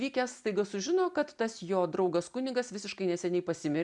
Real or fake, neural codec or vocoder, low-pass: real; none; 10.8 kHz